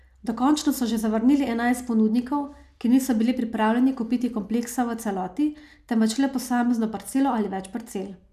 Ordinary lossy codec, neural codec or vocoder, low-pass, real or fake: none; none; 14.4 kHz; real